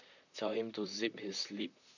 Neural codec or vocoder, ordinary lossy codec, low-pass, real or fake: vocoder, 44.1 kHz, 128 mel bands, Pupu-Vocoder; none; 7.2 kHz; fake